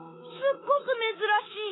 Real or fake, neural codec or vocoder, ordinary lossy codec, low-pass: fake; codec, 24 kHz, 3.1 kbps, DualCodec; AAC, 16 kbps; 7.2 kHz